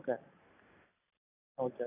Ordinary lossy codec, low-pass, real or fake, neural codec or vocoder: AAC, 16 kbps; 3.6 kHz; real; none